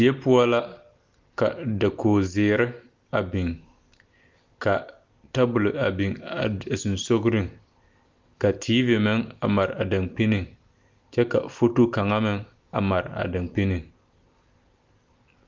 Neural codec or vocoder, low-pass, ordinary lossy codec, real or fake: none; 7.2 kHz; Opus, 24 kbps; real